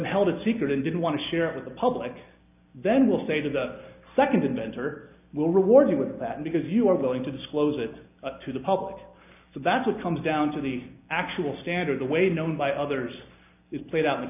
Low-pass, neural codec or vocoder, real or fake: 3.6 kHz; none; real